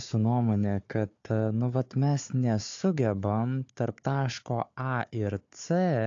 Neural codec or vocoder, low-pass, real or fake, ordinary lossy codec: codec, 16 kHz, 4 kbps, FunCodec, trained on Chinese and English, 50 frames a second; 7.2 kHz; fake; AAC, 48 kbps